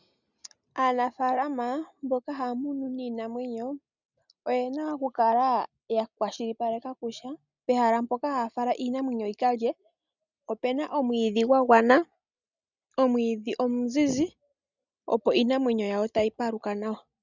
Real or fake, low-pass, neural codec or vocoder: real; 7.2 kHz; none